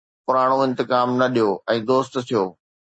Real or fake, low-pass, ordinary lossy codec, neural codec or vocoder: real; 9.9 kHz; MP3, 32 kbps; none